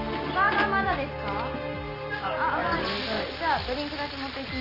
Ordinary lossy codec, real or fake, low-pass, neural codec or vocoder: none; real; 5.4 kHz; none